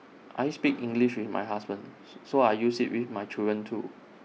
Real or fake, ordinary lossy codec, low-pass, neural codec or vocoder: real; none; none; none